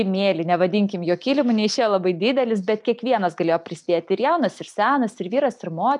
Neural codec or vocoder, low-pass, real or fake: none; 10.8 kHz; real